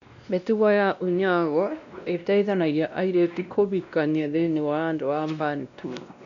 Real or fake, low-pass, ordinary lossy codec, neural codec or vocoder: fake; 7.2 kHz; none; codec, 16 kHz, 1 kbps, X-Codec, WavLM features, trained on Multilingual LibriSpeech